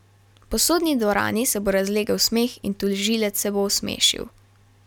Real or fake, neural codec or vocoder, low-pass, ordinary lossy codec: real; none; 19.8 kHz; none